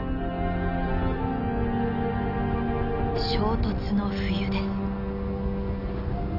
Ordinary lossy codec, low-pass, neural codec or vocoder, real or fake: none; 5.4 kHz; none; real